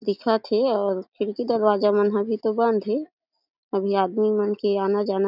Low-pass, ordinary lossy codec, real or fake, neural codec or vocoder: 5.4 kHz; none; real; none